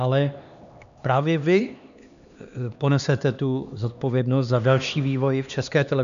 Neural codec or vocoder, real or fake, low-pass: codec, 16 kHz, 2 kbps, X-Codec, HuBERT features, trained on LibriSpeech; fake; 7.2 kHz